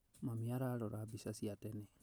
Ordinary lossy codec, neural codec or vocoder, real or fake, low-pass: none; none; real; none